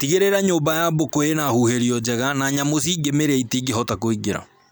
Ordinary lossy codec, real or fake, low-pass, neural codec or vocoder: none; real; none; none